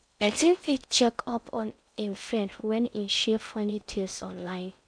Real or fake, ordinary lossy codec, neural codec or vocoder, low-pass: fake; MP3, 96 kbps; codec, 16 kHz in and 24 kHz out, 0.6 kbps, FocalCodec, streaming, 4096 codes; 9.9 kHz